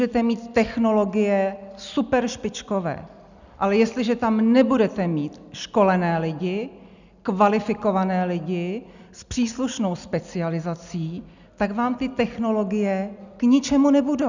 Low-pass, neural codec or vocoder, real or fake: 7.2 kHz; none; real